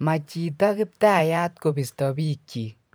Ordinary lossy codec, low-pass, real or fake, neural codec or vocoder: none; none; fake; vocoder, 44.1 kHz, 128 mel bands every 512 samples, BigVGAN v2